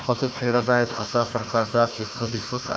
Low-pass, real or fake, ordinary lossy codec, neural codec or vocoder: none; fake; none; codec, 16 kHz, 1 kbps, FunCodec, trained on Chinese and English, 50 frames a second